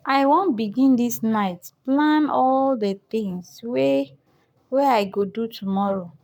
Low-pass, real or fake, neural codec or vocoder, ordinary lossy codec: 19.8 kHz; fake; codec, 44.1 kHz, 7.8 kbps, Pupu-Codec; none